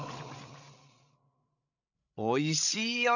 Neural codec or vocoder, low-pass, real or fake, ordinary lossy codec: codec, 16 kHz, 8 kbps, FreqCodec, larger model; 7.2 kHz; fake; none